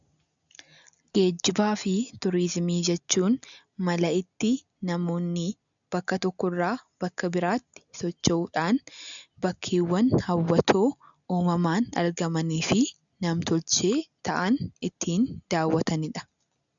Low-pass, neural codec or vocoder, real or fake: 7.2 kHz; none; real